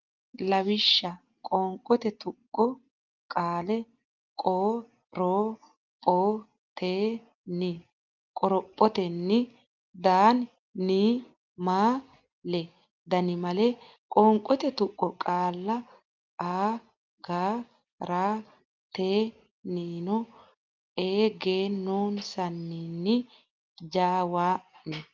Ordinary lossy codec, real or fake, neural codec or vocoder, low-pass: Opus, 24 kbps; real; none; 7.2 kHz